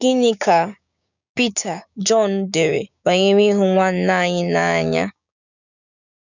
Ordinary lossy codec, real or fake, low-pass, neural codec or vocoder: none; fake; 7.2 kHz; codec, 16 kHz, 6 kbps, DAC